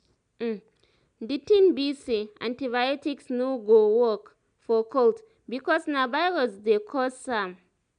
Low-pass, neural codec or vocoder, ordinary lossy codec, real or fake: 9.9 kHz; none; none; real